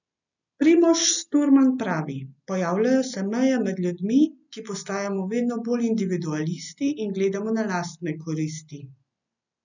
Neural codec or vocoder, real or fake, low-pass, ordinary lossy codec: none; real; 7.2 kHz; none